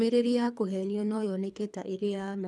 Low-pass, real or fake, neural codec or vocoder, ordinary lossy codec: none; fake; codec, 24 kHz, 3 kbps, HILCodec; none